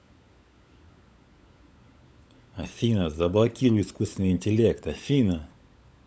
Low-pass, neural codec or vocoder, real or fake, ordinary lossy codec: none; codec, 16 kHz, 16 kbps, FunCodec, trained on LibriTTS, 50 frames a second; fake; none